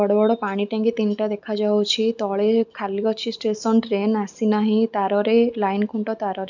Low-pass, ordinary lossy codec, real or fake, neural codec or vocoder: 7.2 kHz; none; real; none